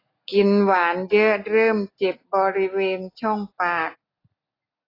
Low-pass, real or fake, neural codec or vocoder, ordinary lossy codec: 5.4 kHz; real; none; AAC, 24 kbps